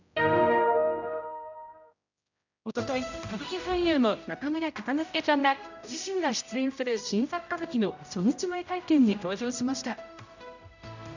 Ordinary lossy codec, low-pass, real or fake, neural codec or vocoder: none; 7.2 kHz; fake; codec, 16 kHz, 0.5 kbps, X-Codec, HuBERT features, trained on general audio